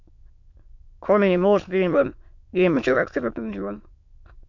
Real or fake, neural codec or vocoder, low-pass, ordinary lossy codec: fake; autoencoder, 22.05 kHz, a latent of 192 numbers a frame, VITS, trained on many speakers; 7.2 kHz; MP3, 48 kbps